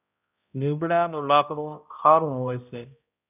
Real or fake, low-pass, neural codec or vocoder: fake; 3.6 kHz; codec, 16 kHz, 0.5 kbps, X-Codec, HuBERT features, trained on balanced general audio